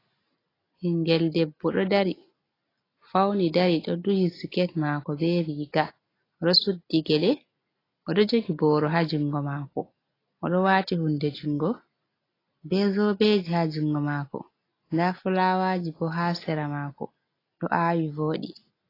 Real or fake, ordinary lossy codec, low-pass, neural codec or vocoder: real; AAC, 24 kbps; 5.4 kHz; none